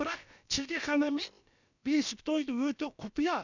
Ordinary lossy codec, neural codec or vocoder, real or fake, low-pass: none; codec, 16 kHz, about 1 kbps, DyCAST, with the encoder's durations; fake; 7.2 kHz